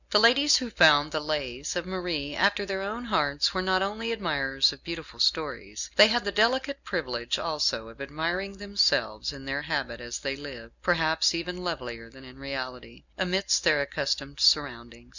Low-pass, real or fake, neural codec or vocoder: 7.2 kHz; real; none